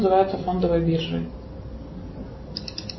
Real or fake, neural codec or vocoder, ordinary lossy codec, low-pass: real; none; MP3, 24 kbps; 7.2 kHz